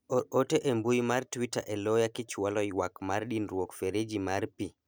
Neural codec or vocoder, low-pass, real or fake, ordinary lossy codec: none; none; real; none